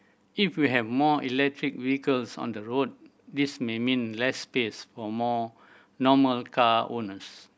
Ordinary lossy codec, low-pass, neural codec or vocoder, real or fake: none; none; none; real